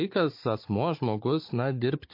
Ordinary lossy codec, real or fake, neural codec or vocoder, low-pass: MP3, 32 kbps; fake; codec, 16 kHz, 16 kbps, FunCodec, trained on Chinese and English, 50 frames a second; 5.4 kHz